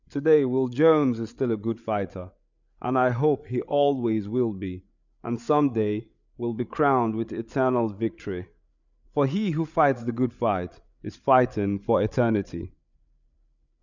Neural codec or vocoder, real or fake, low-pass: codec, 16 kHz, 8 kbps, FreqCodec, larger model; fake; 7.2 kHz